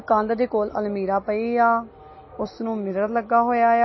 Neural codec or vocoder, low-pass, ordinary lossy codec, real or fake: none; 7.2 kHz; MP3, 24 kbps; real